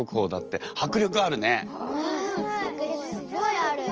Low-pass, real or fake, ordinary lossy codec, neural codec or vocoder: 7.2 kHz; real; Opus, 24 kbps; none